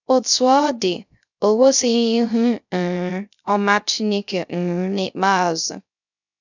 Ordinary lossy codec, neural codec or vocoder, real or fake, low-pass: none; codec, 16 kHz, 0.3 kbps, FocalCodec; fake; 7.2 kHz